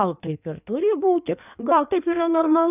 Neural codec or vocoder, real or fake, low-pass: codec, 16 kHz in and 24 kHz out, 1.1 kbps, FireRedTTS-2 codec; fake; 3.6 kHz